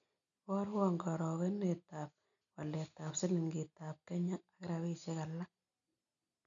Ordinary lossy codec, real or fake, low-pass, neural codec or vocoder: none; real; 7.2 kHz; none